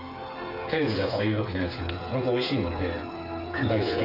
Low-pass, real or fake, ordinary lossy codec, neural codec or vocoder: 5.4 kHz; fake; none; codec, 16 kHz, 8 kbps, FreqCodec, smaller model